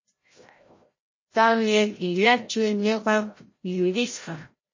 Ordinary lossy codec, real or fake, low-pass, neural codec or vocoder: MP3, 32 kbps; fake; 7.2 kHz; codec, 16 kHz, 0.5 kbps, FreqCodec, larger model